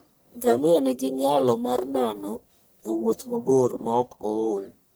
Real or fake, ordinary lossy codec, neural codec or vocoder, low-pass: fake; none; codec, 44.1 kHz, 1.7 kbps, Pupu-Codec; none